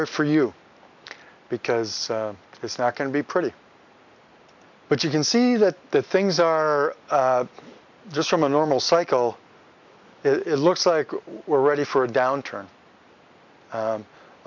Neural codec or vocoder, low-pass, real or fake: none; 7.2 kHz; real